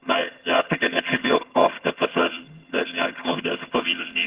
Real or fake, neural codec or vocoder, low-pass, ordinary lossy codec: fake; vocoder, 22.05 kHz, 80 mel bands, HiFi-GAN; 3.6 kHz; Opus, 16 kbps